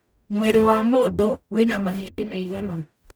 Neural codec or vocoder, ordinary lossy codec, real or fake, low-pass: codec, 44.1 kHz, 0.9 kbps, DAC; none; fake; none